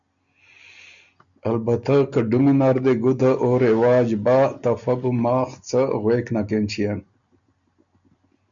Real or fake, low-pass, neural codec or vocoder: real; 7.2 kHz; none